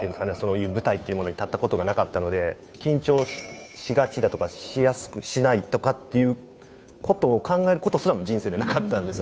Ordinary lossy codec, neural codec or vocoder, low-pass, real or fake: none; codec, 16 kHz, 2 kbps, FunCodec, trained on Chinese and English, 25 frames a second; none; fake